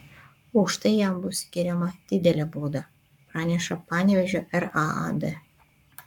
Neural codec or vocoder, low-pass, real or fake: codec, 44.1 kHz, 7.8 kbps, Pupu-Codec; 19.8 kHz; fake